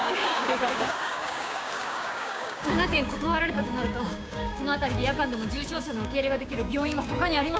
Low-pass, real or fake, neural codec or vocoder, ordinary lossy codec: none; fake; codec, 16 kHz, 6 kbps, DAC; none